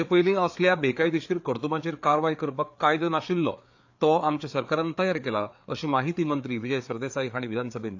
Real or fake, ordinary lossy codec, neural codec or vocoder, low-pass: fake; AAC, 48 kbps; codec, 16 kHz, 4 kbps, FreqCodec, larger model; 7.2 kHz